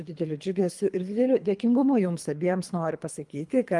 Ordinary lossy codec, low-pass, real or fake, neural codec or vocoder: Opus, 32 kbps; 10.8 kHz; fake; codec, 24 kHz, 3 kbps, HILCodec